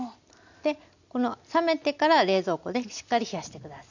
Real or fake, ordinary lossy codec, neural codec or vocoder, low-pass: real; none; none; 7.2 kHz